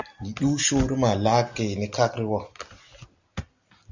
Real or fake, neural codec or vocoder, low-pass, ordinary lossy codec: real; none; 7.2 kHz; Opus, 64 kbps